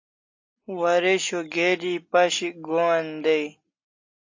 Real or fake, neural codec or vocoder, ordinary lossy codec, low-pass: real; none; AAC, 48 kbps; 7.2 kHz